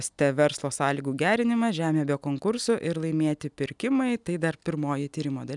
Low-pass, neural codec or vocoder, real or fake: 10.8 kHz; none; real